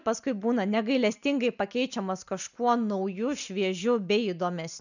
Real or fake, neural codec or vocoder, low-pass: real; none; 7.2 kHz